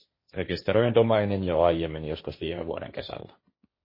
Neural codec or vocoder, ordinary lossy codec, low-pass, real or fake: codec, 16 kHz, 1.1 kbps, Voila-Tokenizer; MP3, 24 kbps; 5.4 kHz; fake